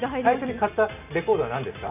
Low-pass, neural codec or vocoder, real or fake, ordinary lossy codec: 3.6 kHz; none; real; none